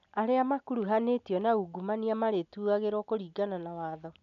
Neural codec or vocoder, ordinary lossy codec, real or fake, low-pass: none; none; real; 7.2 kHz